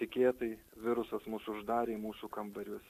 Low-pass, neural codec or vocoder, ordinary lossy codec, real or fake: 14.4 kHz; none; AAC, 64 kbps; real